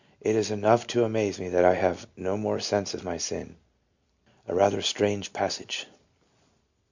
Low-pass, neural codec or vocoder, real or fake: 7.2 kHz; none; real